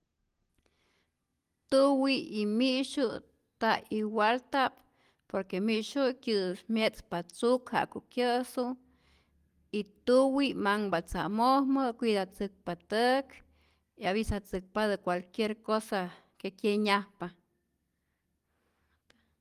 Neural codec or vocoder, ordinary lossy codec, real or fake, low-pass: none; Opus, 24 kbps; real; 14.4 kHz